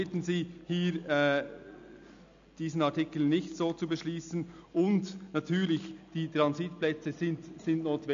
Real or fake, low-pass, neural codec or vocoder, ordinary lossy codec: real; 7.2 kHz; none; AAC, 64 kbps